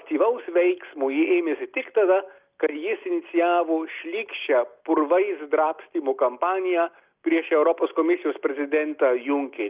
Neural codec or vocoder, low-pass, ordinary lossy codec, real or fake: none; 3.6 kHz; Opus, 24 kbps; real